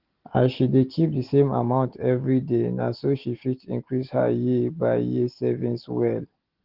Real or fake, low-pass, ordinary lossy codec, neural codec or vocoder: real; 5.4 kHz; Opus, 16 kbps; none